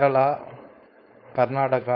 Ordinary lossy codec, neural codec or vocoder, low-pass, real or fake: none; codec, 16 kHz, 4.8 kbps, FACodec; 5.4 kHz; fake